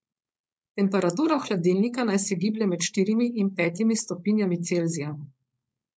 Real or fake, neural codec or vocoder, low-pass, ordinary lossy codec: fake; codec, 16 kHz, 4.8 kbps, FACodec; none; none